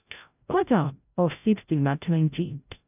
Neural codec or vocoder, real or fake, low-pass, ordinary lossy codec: codec, 16 kHz, 0.5 kbps, FreqCodec, larger model; fake; 3.6 kHz; none